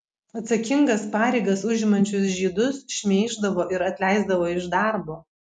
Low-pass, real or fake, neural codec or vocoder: 10.8 kHz; real; none